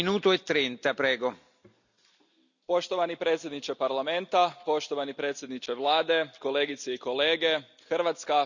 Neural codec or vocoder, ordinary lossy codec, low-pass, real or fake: none; none; 7.2 kHz; real